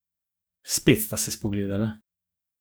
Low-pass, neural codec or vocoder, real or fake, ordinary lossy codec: none; codec, 44.1 kHz, 2.6 kbps, DAC; fake; none